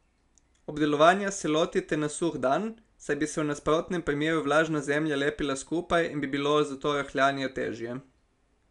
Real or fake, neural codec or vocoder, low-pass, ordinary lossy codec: real; none; 10.8 kHz; none